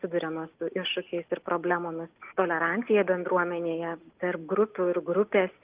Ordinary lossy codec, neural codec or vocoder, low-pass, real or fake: Opus, 32 kbps; none; 3.6 kHz; real